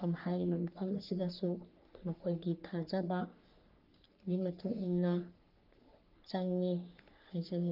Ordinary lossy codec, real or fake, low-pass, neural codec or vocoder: Opus, 32 kbps; fake; 5.4 kHz; codec, 44.1 kHz, 3.4 kbps, Pupu-Codec